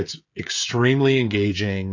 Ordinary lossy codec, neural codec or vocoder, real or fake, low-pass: AAC, 48 kbps; codec, 16 kHz, 16 kbps, FreqCodec, smaller model; fake; 7.2 kHz